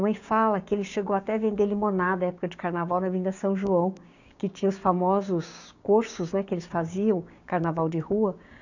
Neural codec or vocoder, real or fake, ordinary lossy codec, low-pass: codec, 16 kHz, 6 kbps, DAC; fake; none; 7.2 kHz